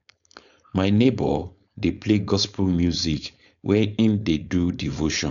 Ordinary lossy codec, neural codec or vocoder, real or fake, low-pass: none; codec, 16 kHz, 4.8 kbps, FACodec; fake; 7.2 kHz